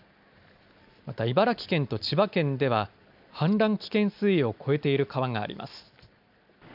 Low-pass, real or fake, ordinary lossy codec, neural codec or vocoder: 5.4 kHz; real; none; none